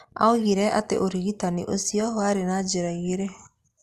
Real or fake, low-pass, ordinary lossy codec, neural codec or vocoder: real; 14.4 kHz; Opus, 32 kbps; none